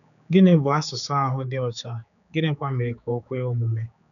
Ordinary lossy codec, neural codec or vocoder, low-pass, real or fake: none; codec, 16 kHz, 4 kbps, X-Codec, HuBERT features, trained on general audio; 7.2 kHz; fake